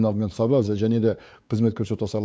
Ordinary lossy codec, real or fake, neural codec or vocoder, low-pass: none; fake; codec, 16 kHz, 4 kbps, X-Codec, WavLM features, trained on Multilingual LibriSpeech; none